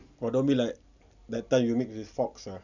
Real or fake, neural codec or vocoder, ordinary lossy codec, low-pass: real; none; none; 7.2 kHz